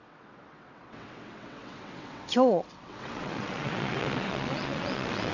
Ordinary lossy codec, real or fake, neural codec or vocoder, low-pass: none; fake; vocoder, 22.05 kHz, 80 mel bands, WaveNeXt; 7.2 kHz